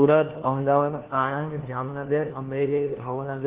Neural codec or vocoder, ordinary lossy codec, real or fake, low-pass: codec, 16 kHz, 1 kbps, FunCodec, trained on LibriTTS, 50 frames a second; Opus, 16 kbps; fake; 3.6 kHz